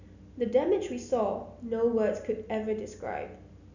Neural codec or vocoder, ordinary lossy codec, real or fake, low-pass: none; none; real; 7.2 kHz